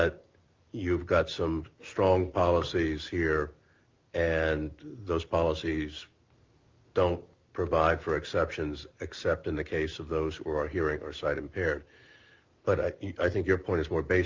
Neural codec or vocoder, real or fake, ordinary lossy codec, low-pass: none; real; Opus, 32 kbps; 7.2 kHz